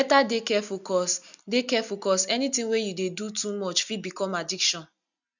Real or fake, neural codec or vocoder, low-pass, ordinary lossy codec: real; none; 7.2 kHz; none